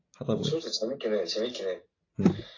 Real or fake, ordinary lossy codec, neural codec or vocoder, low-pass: real; AAC, 32 kbps; none; 7.2 kHz